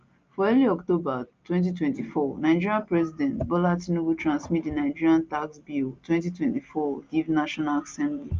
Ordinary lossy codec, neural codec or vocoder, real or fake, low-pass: Opus, 24 kbps; none; real; 7.2 kHz